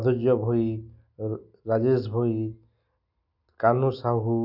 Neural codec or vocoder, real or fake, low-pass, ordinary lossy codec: none; real; 5.4 kHz; none